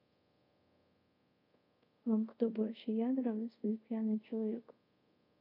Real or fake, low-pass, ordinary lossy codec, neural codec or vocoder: fake; 5.4 kHz; AAC, 48 kbps; codec, 24 kHz, 0.5 kbps, DualCodec